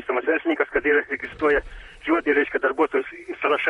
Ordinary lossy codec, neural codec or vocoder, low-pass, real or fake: MP3, 48 kbps; vocoder, 44.1 kHz, 128 mel bands, Pupu-Vocoder; 19.8 kHz; fake